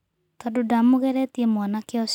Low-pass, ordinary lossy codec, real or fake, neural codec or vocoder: 19.8 kHz; none; real; none